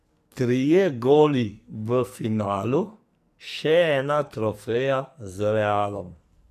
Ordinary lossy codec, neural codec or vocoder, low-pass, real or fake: none; codec, 44.1 kHz, 2.6 kbps, SNAC; 14.4 kHz; fake